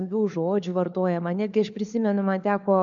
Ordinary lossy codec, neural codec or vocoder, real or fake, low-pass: MP3, 64 kbps; codec, 16 kHz, 4 kbps, FunCodec, trained on LibriTTS, 50 frames a second; fake; 7.2 kHz